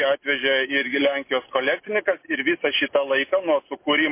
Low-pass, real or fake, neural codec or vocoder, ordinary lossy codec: 3.6 kHz; real; none; MP3, 24 kbps